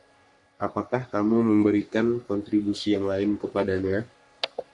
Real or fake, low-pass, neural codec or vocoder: fake; 10.8 kHz; codec, 44.1 kHz, 3.4 kbps, Pupu-Codec